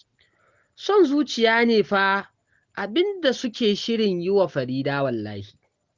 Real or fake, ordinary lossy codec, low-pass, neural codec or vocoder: real; Opus, 16 kbps; 7.2 kHz; none